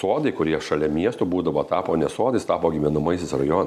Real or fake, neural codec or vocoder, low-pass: real; none; 14.4 kHz